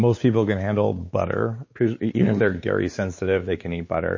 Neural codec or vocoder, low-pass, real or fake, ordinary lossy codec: codec, 16 kHz, 4 kbps, X-Codec, WavLM features, trained on Multilingual LibriSpeech; 7.2 kHz; fake; MP3, 32 kbps